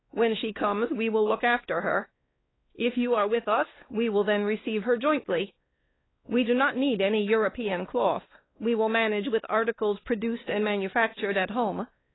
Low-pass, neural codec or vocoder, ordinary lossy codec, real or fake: 7.2 kHz; codec, 16 kHz, 2 kbps, X-Codec, WavLM features, trained on Multilingual LibriSpeech; AAC, 16 kbps; fake